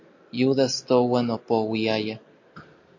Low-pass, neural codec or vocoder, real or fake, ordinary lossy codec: 7.2 kHz; none; real; AAC, 48 kbps